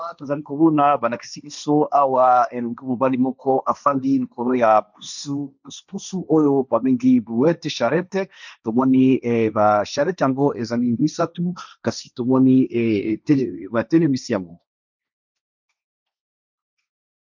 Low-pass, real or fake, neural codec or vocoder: 7.2 kHz; fake; codec, 16 kHz, 1.1 kbps, Voila-Tokenizer